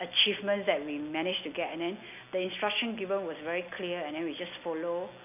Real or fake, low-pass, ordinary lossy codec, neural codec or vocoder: real; 3.6 kHz; none; none